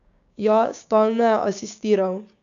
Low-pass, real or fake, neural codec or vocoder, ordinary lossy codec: 7.2 kHz; fake; codec, 16 kHz, 6 kbps, DAC; none